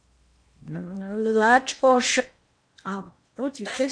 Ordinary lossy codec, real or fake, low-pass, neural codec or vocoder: MP3, 64 kbps; fake; 9.9 kHz; codec, 16 kHz in and 24 kHz out, 0.8 kbps, FocalCodec, streaming, 65536 codes